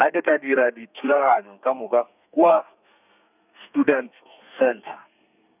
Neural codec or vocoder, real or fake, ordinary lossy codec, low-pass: codec, 32 kHz, 1.9 kbps, SNAC; fake; none; 3.6 kHz